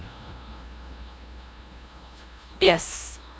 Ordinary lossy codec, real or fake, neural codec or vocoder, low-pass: none; fake; codec, 16 kHz, 0.5 kbps, FunCodec, trained on LibriTTS, 25 frames a second; none